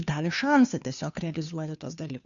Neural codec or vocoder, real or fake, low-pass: codec, 16 kHz, 2 kbps, FunCodec, trained on Chinese and English, 25 frames a second; fake; 7.2 kHz